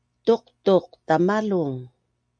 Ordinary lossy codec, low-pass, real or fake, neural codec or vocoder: MP3, 64 kbps; 9.9 kHz; real; none